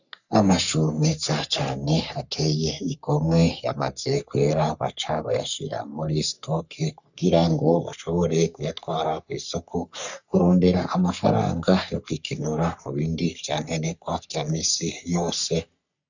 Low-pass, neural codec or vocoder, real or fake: 7.2 kHz; codec, 44.1 kHz, 3.4 kbps, Pupu-Codec; fake